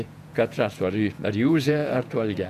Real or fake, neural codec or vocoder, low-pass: fake; vocoder, 48 kHz, 128 mel bands, Vocos; 14.4 kHz